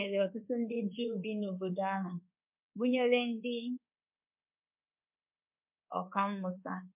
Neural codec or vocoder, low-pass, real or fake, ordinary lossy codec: codec, 24 kHz, 1.2 kbps, DualCodec; 3.6 kHz; fake; MP3, 32 kbps